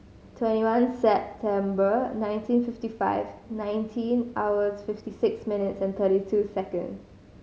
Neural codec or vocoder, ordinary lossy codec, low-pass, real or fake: none; none; none; real